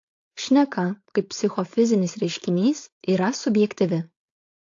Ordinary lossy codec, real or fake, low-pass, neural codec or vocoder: AAC, 48 kbps; fake; 7.2 kHz; codec, 16 kHz, 4.8 kbps, FACodec